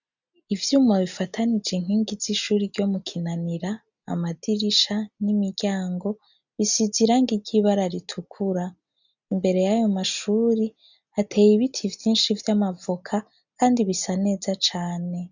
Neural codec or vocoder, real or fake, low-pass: none; real; 7.2 kHz